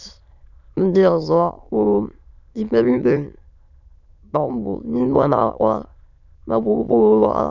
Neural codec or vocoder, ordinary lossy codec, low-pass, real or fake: autoencoder, 22.05 kHz, a latent of 192 numbers a frame, VITS, trained on many speakers; none; 7.2 kHz; fake